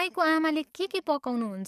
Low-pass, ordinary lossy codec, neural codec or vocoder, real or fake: 14.4 kHz; none; codec, 44.1 kHz, 7.8 kbps, DAC; fake